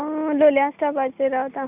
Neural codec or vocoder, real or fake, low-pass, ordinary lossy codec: none; real; 3.6 kHz; none